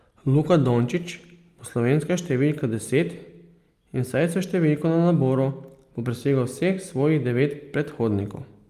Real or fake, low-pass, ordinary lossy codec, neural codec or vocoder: real; 14.4 kHz; Opus, 32 kbps; none